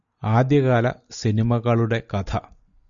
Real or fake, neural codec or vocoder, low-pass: real; none; 7.2 kHz